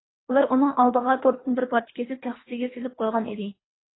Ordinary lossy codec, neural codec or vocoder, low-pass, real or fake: AAC, 16 kbps; codec, 24 kHz, 3 kbps, HILCodec; 7.2 kHz; fake